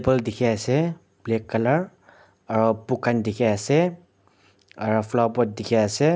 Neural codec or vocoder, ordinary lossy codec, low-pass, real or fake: none; none; none; real